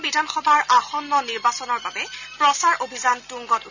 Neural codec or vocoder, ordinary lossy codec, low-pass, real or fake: none; none; 7.2 kHz; real